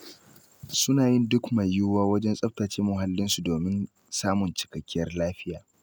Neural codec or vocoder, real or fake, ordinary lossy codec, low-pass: none; real; none; none